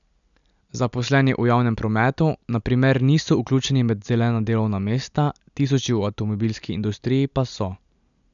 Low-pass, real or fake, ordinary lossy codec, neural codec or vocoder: 7.2 kHz; real; none; none